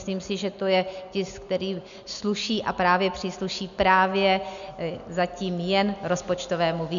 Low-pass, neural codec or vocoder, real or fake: 7.2 kHz; none; real